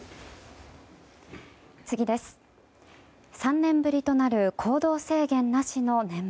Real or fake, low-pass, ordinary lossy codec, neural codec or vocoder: real; none; none; none